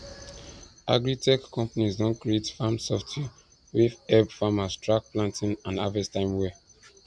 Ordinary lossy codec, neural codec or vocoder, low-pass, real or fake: none; none; 9.9 kHz; real